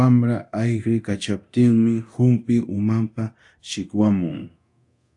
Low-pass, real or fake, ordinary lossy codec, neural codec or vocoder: 10.8 kHz; fake; AAC, 64 kbps; codec, 24 kHz, 0.9 kbps, DualCodec